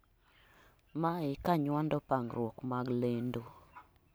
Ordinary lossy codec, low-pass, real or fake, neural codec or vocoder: none; none; real; none